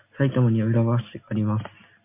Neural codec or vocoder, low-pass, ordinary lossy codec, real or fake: none; 3.6 kHz; MP3, 24 kbps; real